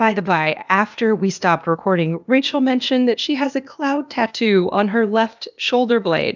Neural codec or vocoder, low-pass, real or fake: codec, 16 kHz, 0.8 kbps, ZipCodec; 7.2 kHz; fake